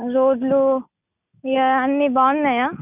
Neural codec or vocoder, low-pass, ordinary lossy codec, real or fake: none; 3.6 kHz; none; real